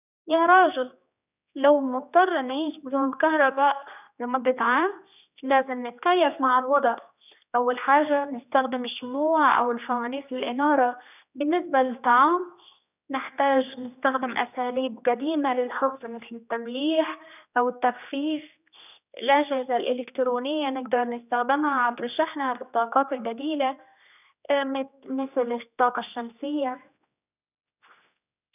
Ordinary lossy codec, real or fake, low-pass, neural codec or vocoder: none; fake; 3.6 kHz; codec, 16 kHz, 2 kbps, X-Codec, HuBERT features, trained on general audio